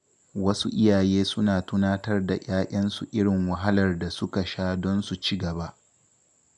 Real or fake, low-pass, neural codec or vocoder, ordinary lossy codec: real; none; none; none